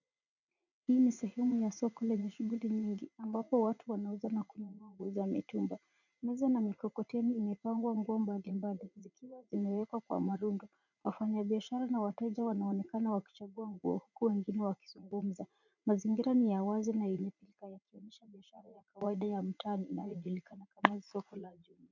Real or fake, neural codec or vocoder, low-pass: fake; vocoder, 44.1 kHz, 80 mel bands, Vocos; 7.2 kHz